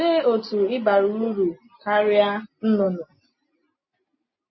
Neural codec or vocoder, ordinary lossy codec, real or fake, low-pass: none; MP3, 24 kbps; real; 7.2 kHz